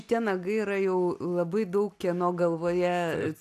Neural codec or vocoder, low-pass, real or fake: none; 14.4 kHz; real